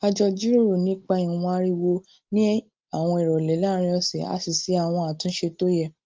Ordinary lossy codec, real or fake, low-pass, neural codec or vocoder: Opus, 32 kbps; real; 7.2 kHz; none